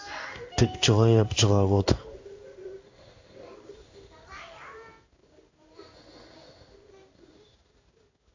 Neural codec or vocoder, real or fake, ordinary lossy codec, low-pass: codec, 16 kHz in and 24 kHz out, 1 kbps, XY-Tokenizer; fake; AAC, 48 kbps; 7.2 kHz